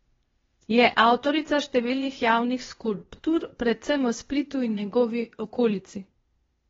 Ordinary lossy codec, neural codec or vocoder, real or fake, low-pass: AAC, 24 kbps; codec, 16 kHz, 0.8 kbps, ZipCodec; fake; 7.2 kHz